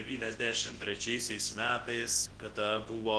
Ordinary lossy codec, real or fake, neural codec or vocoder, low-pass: Opus, 16 kbps; fake; codec, 24 kHz, 0.9 kbps, WavTokenizer, large speech release; 10.8 kHz